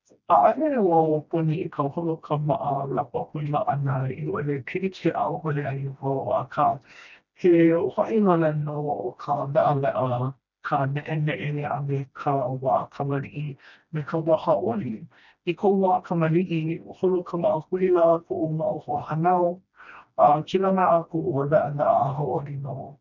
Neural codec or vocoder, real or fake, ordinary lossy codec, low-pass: codec, 16 kHz, 1 kbps, FreqCodec, smaller model; fake; none; 7.2 kHz